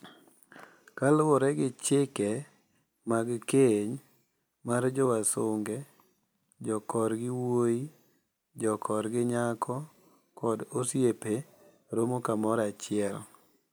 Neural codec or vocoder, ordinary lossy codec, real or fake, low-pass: none; none; real; none